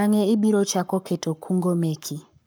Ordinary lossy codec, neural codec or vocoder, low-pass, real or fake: none; codec, 44.1 kHz, 7.8 kbps, Pupu-Codec; none; fake